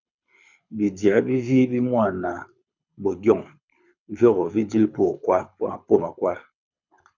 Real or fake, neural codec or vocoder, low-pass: fake; codec, 24 kHz, 6 kbps, HILCodec; 7.2 kHz